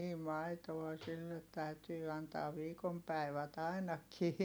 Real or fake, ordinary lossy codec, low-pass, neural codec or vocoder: real; none; none; none